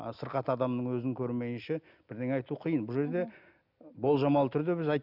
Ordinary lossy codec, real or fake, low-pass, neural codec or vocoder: none; real; 5.4 kHz; none